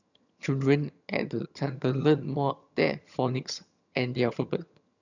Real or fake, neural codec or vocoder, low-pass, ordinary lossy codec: fake; vocoder, 22.05 kHz, 80 mel bands, HiFi-GAN; 7.2 kHz; none